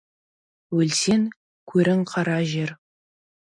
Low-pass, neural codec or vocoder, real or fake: 9.9 kHz; none; real